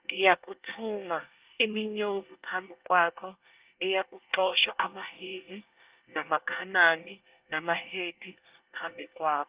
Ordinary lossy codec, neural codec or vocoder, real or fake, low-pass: Opus, 32 kbps; codec, 24 kHz, 1 kbps, SNAC; fake; 3.6 kHz